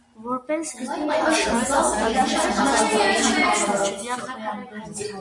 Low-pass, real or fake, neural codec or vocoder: 10.8 kHz; real; none